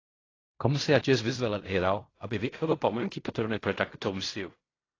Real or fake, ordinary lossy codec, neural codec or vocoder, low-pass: fake; AAC, 32 kbps; codec, 16 kHz in and 24 kHz out, 0.4 kbps, LongCat-Audio-Codec, fine tuned four codebook decoder; 7.2 kHz